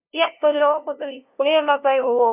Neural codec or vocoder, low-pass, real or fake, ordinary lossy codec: codec, 16 kHz, 0.5 kbps, FunCodec, trained on LibriTTS, 25 frames a second; 3.6 kHz; fake; none